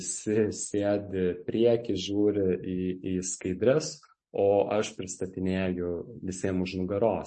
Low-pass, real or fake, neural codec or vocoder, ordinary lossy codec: 10.8 kHz; real; none; MP3, 32 kbps